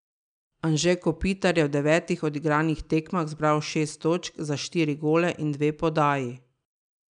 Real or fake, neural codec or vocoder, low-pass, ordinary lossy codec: real; none; 9.9 kHz; none